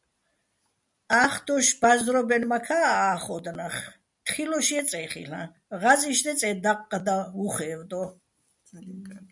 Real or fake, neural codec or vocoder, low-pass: real; none; 10.8 kHz